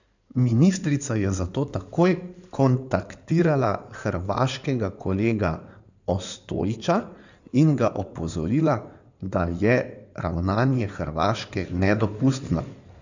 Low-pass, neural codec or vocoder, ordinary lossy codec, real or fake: 7.2 kHz; codec, 16 kHz in and 24 kHz out, 2.2 kbps, FireRedTTS-2 codec; none; fake